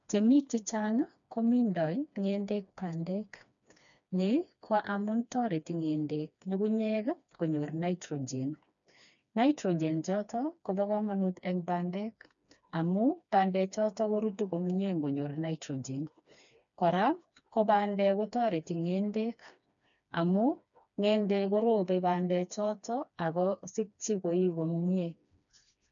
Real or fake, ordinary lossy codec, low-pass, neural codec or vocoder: fake; none; 7.2 kHz; codec, 16 kHz, 2 kbps, FreqCodec, smaller model